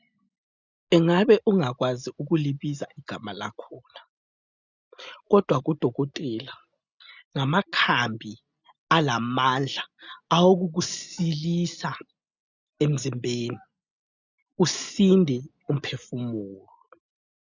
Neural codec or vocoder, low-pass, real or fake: none; 7.2 kHz; real